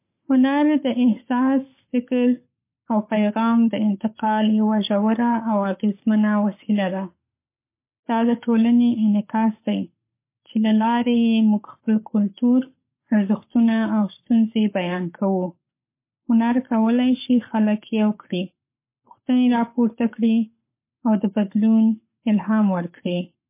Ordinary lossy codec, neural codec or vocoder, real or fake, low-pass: MP3, 24 kbps; codec, 44.1 kHz, 7.8 kbps, Pupu-Codec; fake; 3.6 kHz